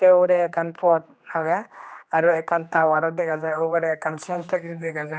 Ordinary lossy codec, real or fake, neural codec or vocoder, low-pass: none; fake; codec, 16 kHz, 2 kbps, X-Codec, HuBERT features, trained on general audio; none